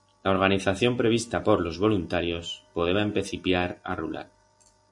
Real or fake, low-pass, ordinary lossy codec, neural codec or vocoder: real; 10.8 kHz; MP3, 48 kbps; none